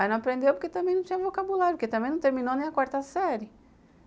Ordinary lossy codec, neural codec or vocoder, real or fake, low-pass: none; none; real; none